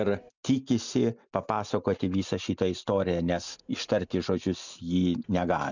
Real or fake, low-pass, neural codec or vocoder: real; 7.2 kHz; none